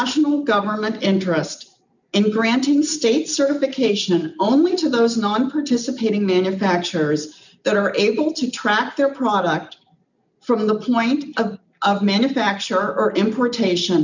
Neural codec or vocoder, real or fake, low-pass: none; real; 7.2 kHz